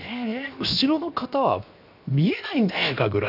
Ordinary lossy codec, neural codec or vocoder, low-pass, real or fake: none; codec, 16 kHz, 0.7 kbps, FocalCodec; 5.4 kHz; fake